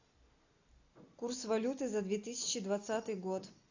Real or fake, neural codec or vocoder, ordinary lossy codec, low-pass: real; none; AAC, 48 kbps; 7.2 kHz